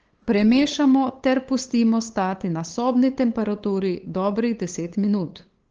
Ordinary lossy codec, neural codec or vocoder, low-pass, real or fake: Opus, 16 kbps; codec, 16 kHz, 8 kbps, FunCodec, trained on LibriTTS, 25 frames a second; 7.2 kHz; fake